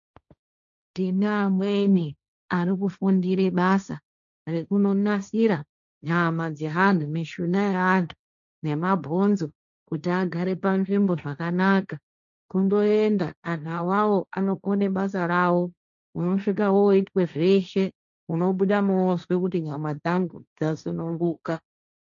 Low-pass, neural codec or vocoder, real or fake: 7.2 kHz; codec, 16 kHz, 1.1 kbps, Voila-Tokenizer; fake